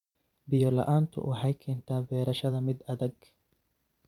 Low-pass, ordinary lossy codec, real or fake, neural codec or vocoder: 19.8 kHz; none; fake; vocoder, 44.1 kHz, 128 mel bands every 256 samples, BigVGAN v2